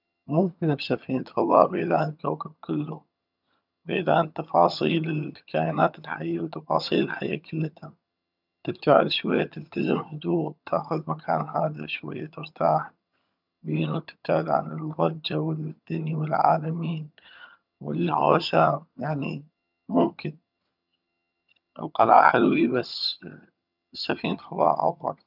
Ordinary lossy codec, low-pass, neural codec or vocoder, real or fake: none; 5.4 kHz; vocoder, 22.05 kHz, 80 mel bands, HiFi-GAN; fake